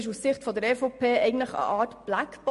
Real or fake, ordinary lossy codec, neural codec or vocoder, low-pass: real; MP3, 48 kbps; none; 14.4 kHz